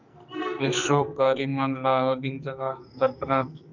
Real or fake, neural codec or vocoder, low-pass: fake; codec, 44.1 kHz, 2.6 kbps, SNAC; 7.2 kHz